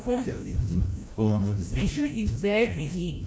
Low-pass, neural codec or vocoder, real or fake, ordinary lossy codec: none; codec, 16 kHz, 0.5 kbps, FreqCodec, larger model; fake; none